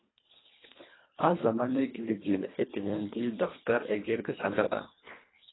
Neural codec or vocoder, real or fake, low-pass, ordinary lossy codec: codec, 24 kHz, 1.5 kbps, HILCodec; fake; 7.2 kHz; AAC, 16 kbps